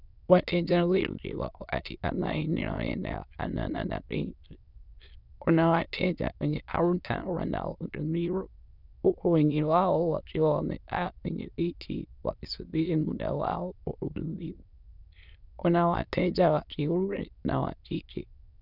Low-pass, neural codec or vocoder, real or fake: 5.4 kHz; autoencoder, 22.05 kHz, a latent of 192 numbers a frame, VITS, trained on many speakers; fake